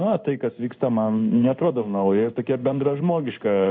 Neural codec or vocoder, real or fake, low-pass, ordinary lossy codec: codec, 16 kHz in and 24 kHz out, 1 kbps, XY-Tokenizer; fake; 7.2 kHz; MP3, 64 kbps